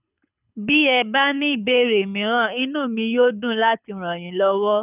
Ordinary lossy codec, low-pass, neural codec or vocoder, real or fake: none; 3.6 kHz; codec, 24 kHz, 6 kbps, HILCodec; fake